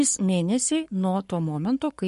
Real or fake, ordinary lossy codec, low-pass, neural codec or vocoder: fake; MP3, 48 kbps; 14.4 kHz; codec, 44.1 kHz, 7.8 kbps, Pupu-Codec